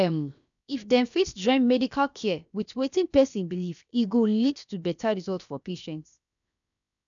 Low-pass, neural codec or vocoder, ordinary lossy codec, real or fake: 7.2 kHz; codec, 16 kHz, 0.7 kbps, FocalCodec; none; fake